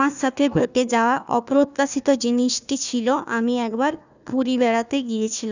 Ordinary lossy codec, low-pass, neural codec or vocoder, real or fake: none; 7.2 kHz; codec, 16 kHz, 1 kbps, FunCodec, trained on Chinese and English, 50 frames a second; fake